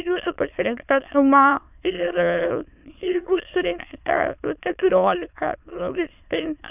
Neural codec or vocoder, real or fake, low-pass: autoencoder, 22.05 kHz, a latent of 192 numbers a frame, VITS, trained on many speakers; fake; 3.6 kHz